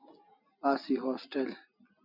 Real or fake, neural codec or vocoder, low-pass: real; none; 5.4 kHz